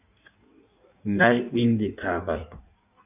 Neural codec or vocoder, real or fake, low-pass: codec, 16 kHz in and 24 kHz out, 1.1 kbps, FireRedTTS-2 codec; fake; 3.6 kHz